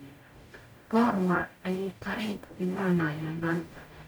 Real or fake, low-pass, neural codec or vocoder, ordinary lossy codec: fake; none; codec, 44.1 kHz, 0.9 kbps, DAC; none